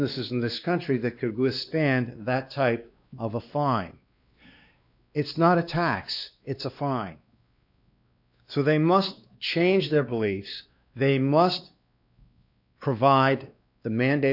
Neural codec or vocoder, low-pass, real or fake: codec, 16 kHz, 2 kbps, X-Codec, WavLM features, trained on Multilingual LibriSpeech; 5.4 kHz; fake